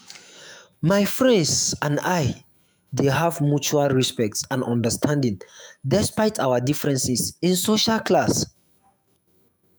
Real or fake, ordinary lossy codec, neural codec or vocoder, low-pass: fake; none; autoencoder, 48 kHz, 128 numbers a frame, DAC-VAE, trained on Japanese speech; none